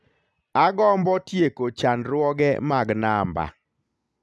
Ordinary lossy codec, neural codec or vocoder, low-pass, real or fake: none; none; none; real